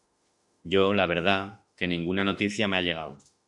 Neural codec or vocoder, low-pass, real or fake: autoencoder, 48 kHz, 32 numbers a frame, DAC-VAE, trained on Japanese speech; 10.8 kHz; fake